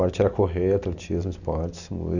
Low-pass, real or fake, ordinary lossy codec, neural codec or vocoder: 7.2 kHz; real; none; none